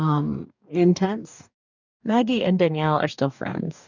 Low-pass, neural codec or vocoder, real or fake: 7.2 kHz; codec, 44.1 kHz, 2.6 kbps, DAC; fake